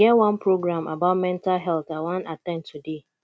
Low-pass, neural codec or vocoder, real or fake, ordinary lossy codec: none; none; real; none